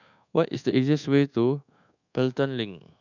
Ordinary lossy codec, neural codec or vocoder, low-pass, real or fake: none; codec, 24 kHz, 1.2 kbps, DualCodec; 7.2 kHz; fake